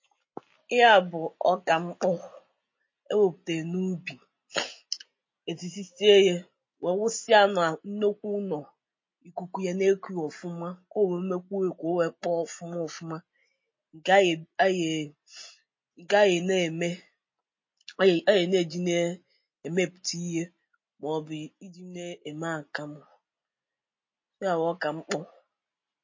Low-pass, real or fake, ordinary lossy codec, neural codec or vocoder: 7.2 kHz; real; MP3, 32 kbps; none